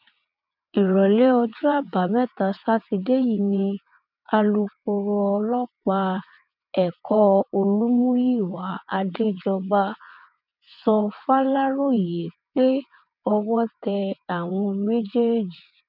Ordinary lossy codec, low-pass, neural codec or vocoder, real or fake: none; 5.4 kHz; vocoder, 22.05 kHz, 80 mel bands, WaveNeXt; fake